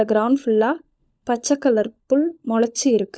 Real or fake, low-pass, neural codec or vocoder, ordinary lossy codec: fake; none; codec, 16 kHz, 16 kbps, FunCodec, trained on LibriTTS, 50 frames a second; none